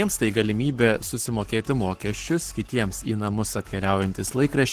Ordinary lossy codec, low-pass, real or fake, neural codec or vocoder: Opus, 16 kbps; 14.4 kHz; fake; codec, 44.1 kHz, 7.8 kbps, Pupu-Codec